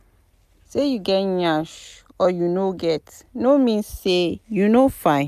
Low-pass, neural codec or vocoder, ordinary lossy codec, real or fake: 14.4 kHz; none; none; real